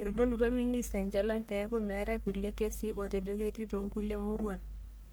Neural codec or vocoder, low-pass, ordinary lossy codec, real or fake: codec, 44.1 kHz, 1.7 kbps, Pupu-Codec; none; none; fake